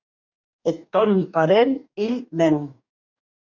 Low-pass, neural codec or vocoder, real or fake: 7.2 kHz; codec, 44.1 kHz, 2.6 kbps, DAC; fake